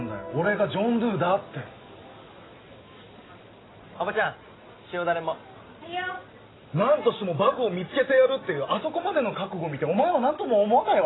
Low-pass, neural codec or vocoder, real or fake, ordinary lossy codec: 7.2 kHz; none; real; AAC, 16 kbps